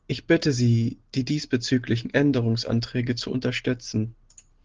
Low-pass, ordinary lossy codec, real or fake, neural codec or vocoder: 7.2 kHz; Opus, 16 kbps; real; none